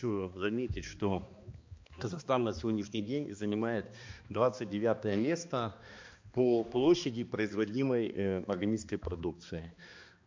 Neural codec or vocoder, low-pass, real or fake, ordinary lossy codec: codec, 16 kHz, 2 kbps, X-Codec, HuBERT features, trained on balanced general audio; 7.2 kHz; fake; MP3, 48 kbps